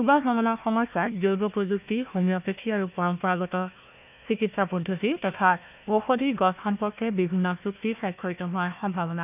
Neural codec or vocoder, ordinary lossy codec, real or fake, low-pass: codec, 16 kHz, 1 kbps, FunCodec, trained on Chinese and English, 50 frames a second; none; fake; 3.6 kHz